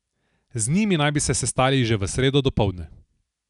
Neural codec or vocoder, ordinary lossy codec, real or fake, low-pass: none; none; real; 10.8 kHz